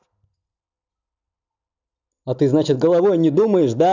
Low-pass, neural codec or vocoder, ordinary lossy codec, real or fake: 7.2 kHz; none; none; real